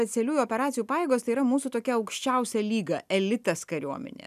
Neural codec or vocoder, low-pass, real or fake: none; 14.4 kHz; real